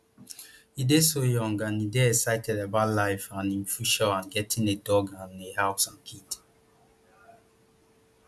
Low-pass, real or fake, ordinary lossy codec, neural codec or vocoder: none; real; none; none